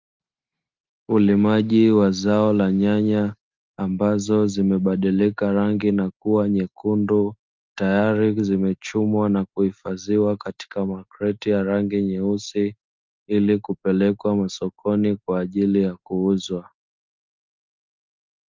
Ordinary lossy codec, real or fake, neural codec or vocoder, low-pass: Opus, 24 kbps; real; none; 7.2 kHz